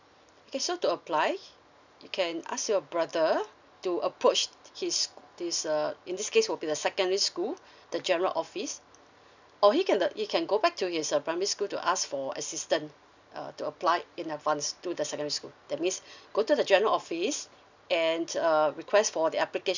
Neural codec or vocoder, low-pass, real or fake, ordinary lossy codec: none; 7.2 kHz; real; none